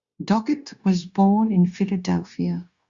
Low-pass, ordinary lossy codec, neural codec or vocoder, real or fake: 7.2 kHz; Opus, 64 kbps; codec, 16 kHz, 0.9 kbps, LongCat-Audio-Codec; fake